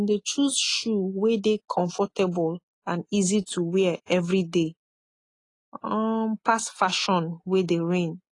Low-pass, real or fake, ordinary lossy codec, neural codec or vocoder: 10.8 kHz; real; AAC, 32 kbps; none